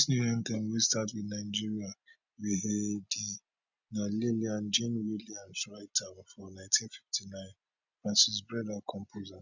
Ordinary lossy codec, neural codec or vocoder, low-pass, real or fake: none; none; 7.2 kHz; real